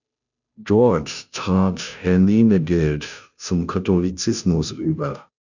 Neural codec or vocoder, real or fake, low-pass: codec, 16 kHz, 0.5 kbps, FunCodec, trained on Chinese and English, 25 frames a second; fake; 7.2 kHz